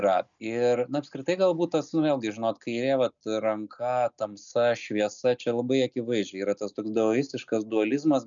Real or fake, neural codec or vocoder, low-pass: real; none; 7.2 kHz